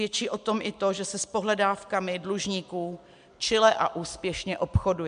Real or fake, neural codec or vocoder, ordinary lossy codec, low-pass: real; none; MP3, 64 kbps; 9.9 kHz